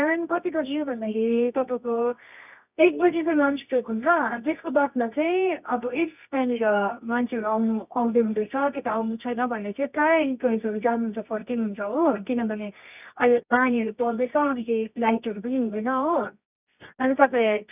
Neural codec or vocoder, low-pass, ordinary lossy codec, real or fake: codec, 24 kHz, 0.9 kbps, WavTokenizer, medium music audio release; 3.6 kHz; none; fake